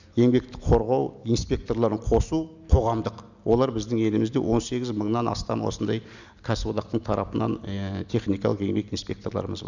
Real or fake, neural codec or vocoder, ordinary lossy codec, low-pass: real; none; none; 7.2 kHz